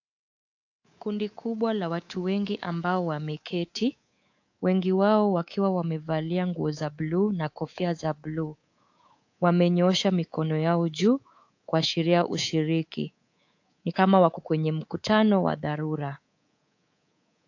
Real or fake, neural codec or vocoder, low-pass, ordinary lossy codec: fake; codec, 24 kHz, 3.1 kbps, DualCodec; 7.2 kHz; AAC, 48 kbps